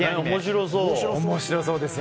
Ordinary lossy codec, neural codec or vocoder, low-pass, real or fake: none; none; none; real